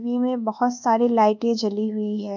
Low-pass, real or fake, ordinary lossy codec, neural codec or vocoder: 7.2 kHz; fake; none; codec, 24 kHz, 1.2 kbps, DualCodec